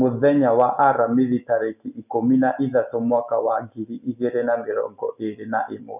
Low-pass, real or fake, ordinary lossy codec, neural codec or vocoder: 3.6 kHz; real; none; none